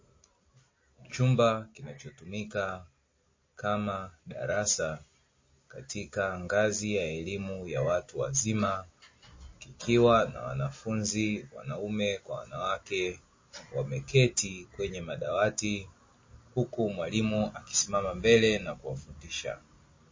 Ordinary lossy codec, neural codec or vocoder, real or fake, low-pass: MP3, 32 kbps; none; real; 7.2 kHz